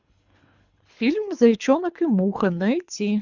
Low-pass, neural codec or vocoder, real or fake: 7.2 kHz; codec, 24 kHz, 3 kbps, HILCodec; fake